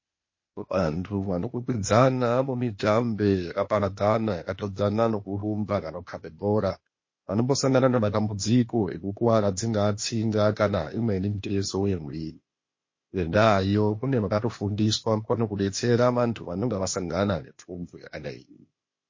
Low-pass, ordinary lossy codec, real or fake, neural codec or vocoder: 7.2 kHz; MP3, 32 kbps; fake; codec, 16 kHz, 0.8 kbps, ZipCodec